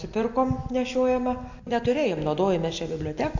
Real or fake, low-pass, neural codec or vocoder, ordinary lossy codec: real; 7.2 kHz; none; AAC, 48 kbps